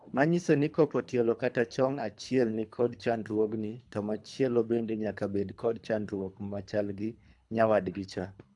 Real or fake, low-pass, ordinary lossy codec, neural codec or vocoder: fake; 10.8 kHz; AAC, 64 kbps; codec, 24 kHz, 3 kbps, HILCodec